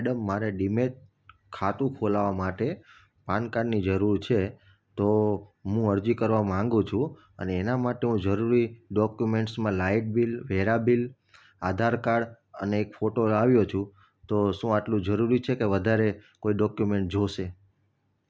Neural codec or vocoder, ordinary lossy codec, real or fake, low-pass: none; none; real; none